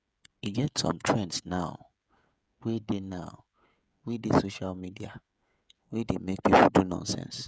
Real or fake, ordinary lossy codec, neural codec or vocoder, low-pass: fake; none; codec, 16 kHz, 16 kbps, FreqCodec, smaller model; none